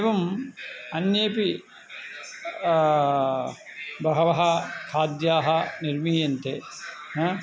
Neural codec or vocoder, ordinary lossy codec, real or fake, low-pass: none; none; real; none